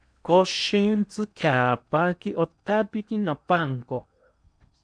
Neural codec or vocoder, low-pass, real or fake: codec, 16 kHz in and 24 kHz out, 0.8 kbps, FocalCodec, streaming, 65536 codes; 9.9 kHz; fake